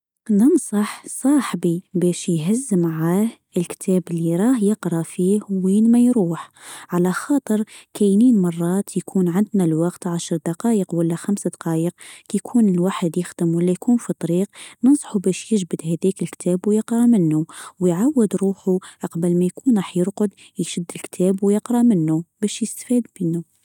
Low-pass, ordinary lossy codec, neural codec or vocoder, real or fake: 19.8 kHz; none; none; real